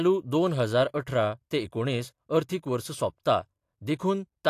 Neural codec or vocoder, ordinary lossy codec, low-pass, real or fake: none; AAC, 64 kbps; 14.4 kHz; real